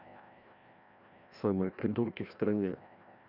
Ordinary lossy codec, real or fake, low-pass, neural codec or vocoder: none; fake; 5.4 kHz; codec, 16 kHz, 1 kbps, FreqCodec, larger model